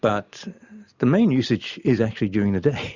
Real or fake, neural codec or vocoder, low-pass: real; none; 7.2 kHz